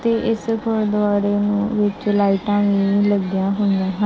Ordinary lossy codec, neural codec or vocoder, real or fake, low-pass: none; none; real; none